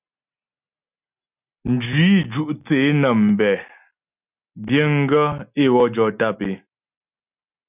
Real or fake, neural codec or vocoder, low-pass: real; none; 3.6 kHz